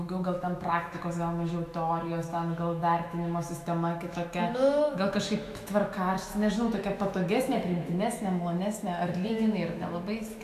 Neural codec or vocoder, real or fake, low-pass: autoencoder, 48 kHz, 128 numbers a frame, DAC-VAE, trained on Japanese speech; fake; 14.4 kHz